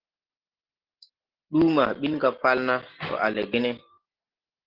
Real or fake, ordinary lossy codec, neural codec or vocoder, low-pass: real; Opus, 16 kbps; none; 5.4 kHz